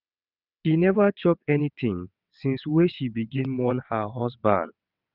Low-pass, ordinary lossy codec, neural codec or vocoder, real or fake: 5.4 kHz; none; vocoder, 22.05 kHz, 80 mel bands, WaveNeXt; fake